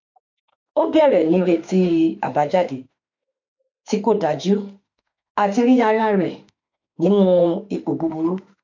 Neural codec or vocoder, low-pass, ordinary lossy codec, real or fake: autoencoder, 48 kHz, 32 numbers a frame, DAC-VAE, trained on Japanese speech; 7.2 kHz; MP3, 64 kbps; fake